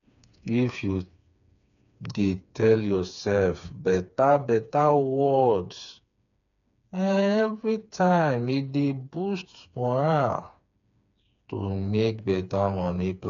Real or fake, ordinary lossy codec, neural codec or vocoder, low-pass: fake; none; codec, 16 kHz, 4 kbps, FreqCodec, smaller model; 7.2 kHz